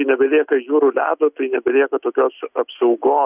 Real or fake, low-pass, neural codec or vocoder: real; 3.6 kHz; none